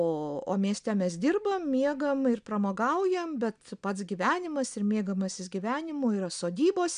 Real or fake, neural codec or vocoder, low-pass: real; none; 9.9 kHz